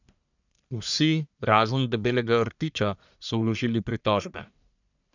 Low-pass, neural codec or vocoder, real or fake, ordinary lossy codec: 7.2 kHz; codec, 44.1 kHz, 1.7 kbps, Pupu-Codec; fake; none